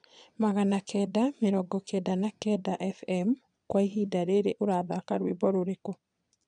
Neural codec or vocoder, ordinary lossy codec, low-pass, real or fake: vocoder, 22.05 kHz, 80 mel bands, Vocos; none; 9.9 kHz; fake